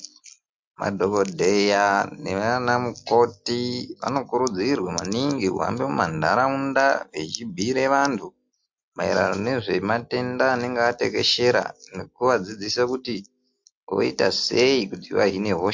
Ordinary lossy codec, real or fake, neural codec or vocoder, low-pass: MP3, 48 kbps; real; none; 7.2 kHz